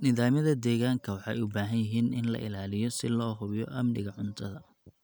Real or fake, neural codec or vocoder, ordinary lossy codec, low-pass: real; none; none; none